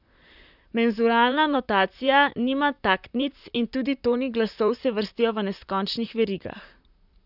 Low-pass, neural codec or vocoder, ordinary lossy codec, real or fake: 5.4 kHz; vocoder, 44.1 kHz, 128 mel bands, Pupu-Vocoder; none; fake